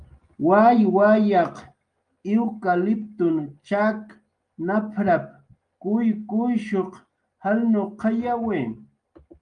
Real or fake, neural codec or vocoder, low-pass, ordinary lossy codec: real; none; 9.9 kHz; Opus, 32 kbps